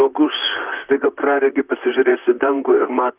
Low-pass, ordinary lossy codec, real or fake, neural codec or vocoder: 3.6 kHz; Opus, 32 kbps; fake; vocoder, 44.1 kHz, 128 mel bands, Pupu-Vocoder